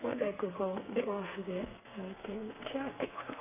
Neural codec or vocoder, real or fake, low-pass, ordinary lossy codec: codec, 32 kHz, 1.9 kbps, SNAC; fake; 3.6 kHz; none